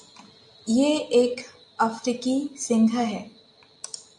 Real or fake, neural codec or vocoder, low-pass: real; none; 10.8 kHz